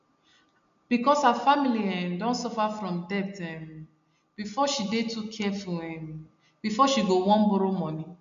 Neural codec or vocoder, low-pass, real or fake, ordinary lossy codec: none; 7.2 kHz; real; MP3, 64 kbps